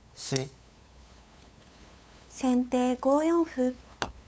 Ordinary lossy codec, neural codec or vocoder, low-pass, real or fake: none; codec, 16 kHz, 8 kbps, FunCodec, trained on LibriTTS, 25 frames a second; none; fake